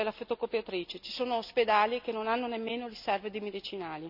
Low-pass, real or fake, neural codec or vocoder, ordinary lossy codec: 5.4 kHz; real; none; none